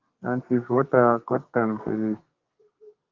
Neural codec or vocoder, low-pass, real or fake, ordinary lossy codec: codec, 32 kHz, 1.9 kbps, SNAC; 7.2 kHz; fake; Opus, 24 kbps